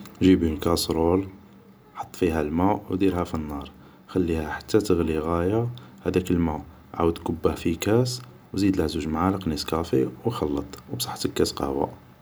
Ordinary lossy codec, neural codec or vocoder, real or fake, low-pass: none; none; real; none